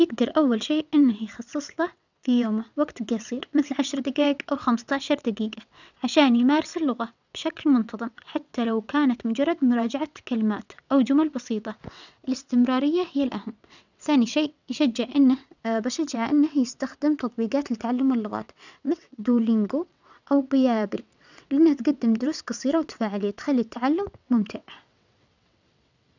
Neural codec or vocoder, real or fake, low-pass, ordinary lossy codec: vocoder, 22.05 kHz, 80 mel bands, WaveNeXt; fake; 7.2 kHz; none